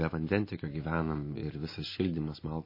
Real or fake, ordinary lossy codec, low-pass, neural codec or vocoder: real; MP3, 24 kbps; 5.4 kHz; none